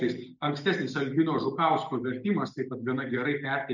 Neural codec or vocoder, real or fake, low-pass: none; real; 7.2 kHz